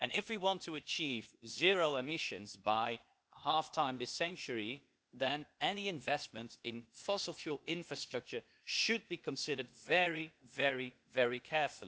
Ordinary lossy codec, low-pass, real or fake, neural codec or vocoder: none; none; fake; codec, 16 kHz, 0.8 kbps, ZipCodec